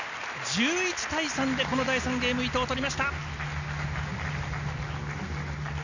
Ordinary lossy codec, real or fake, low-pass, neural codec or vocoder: none; real; 7.2 kHz; none